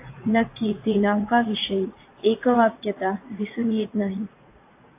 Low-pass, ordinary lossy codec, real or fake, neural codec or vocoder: 3.6 kHz; AAC, 24 kbps; fake; vocoder, 44.1 kHz, 80 mel bands, Vocos